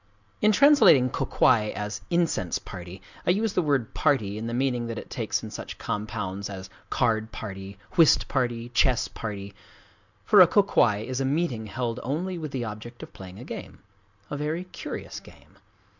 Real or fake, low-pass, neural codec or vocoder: real; 7.2 kHz; none